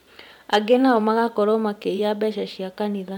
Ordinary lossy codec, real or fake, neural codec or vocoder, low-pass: none; fake; vocoder, 44.1 kHz, 128 mel bands every 512 samples, BigVGAN v2; 19.8 kHz